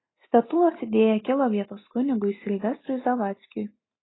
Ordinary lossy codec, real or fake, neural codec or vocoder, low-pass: AAC, 16 kbps; real; none; 7.2 kHz